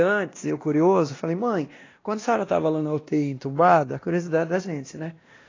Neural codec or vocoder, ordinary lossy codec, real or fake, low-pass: codec, 16 kHz, 1 kbps, X-Codec, WavLM features, trained on Multilingual LibriSpeech; AAC, 32 kbps; fake; 7.2 kHz